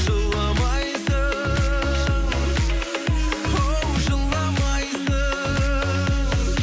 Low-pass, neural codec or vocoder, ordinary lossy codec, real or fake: none; none; none; real